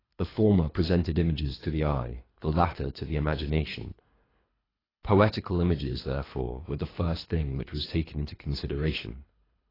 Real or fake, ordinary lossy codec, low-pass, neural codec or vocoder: fake; AAC, 24 kbps; 5.4 kHz; codec, 24 kHz, 3 kbps, HILCodec